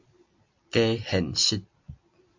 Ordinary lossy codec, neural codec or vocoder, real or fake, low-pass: MP3, 48 kbps; vocoder, 44.1 kHz, 128 mel bands every 256 samples, BigVGAN v2; fake; 7.2 kHz